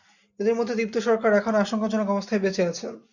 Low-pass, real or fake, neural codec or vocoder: 7.2 kHz; real; none